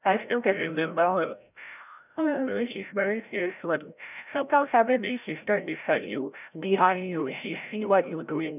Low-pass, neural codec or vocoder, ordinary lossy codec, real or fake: 3.6 kHz; codec, 16 kHz, 0.5 kbps, FreqCodec, larger model; none; fake